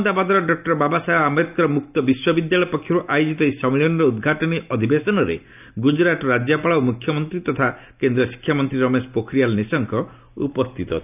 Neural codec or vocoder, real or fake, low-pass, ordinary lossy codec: none; real; 3.6 kHz; none